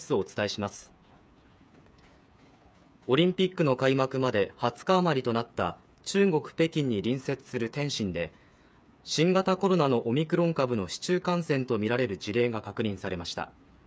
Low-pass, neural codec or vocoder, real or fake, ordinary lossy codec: none; codec, 16 kHz, 8 kbps, FreqCodec, smaller model; fake; none